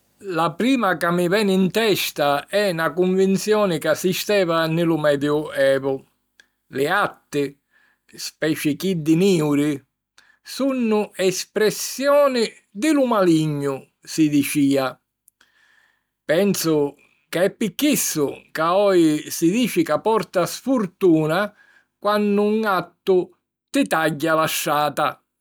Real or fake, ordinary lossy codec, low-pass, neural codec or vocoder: real; none; none; none